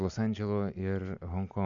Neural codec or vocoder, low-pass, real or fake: none; 7.2 kHz; real